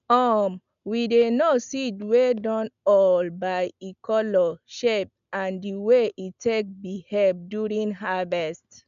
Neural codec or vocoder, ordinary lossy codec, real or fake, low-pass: none; none; real; 7.2 kHz